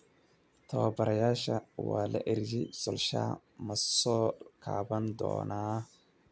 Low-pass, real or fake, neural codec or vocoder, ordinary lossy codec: none; real; none; none